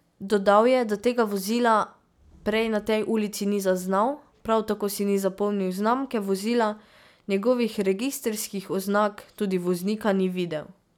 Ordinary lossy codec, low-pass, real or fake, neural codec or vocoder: none; 19.8 kHz; real; none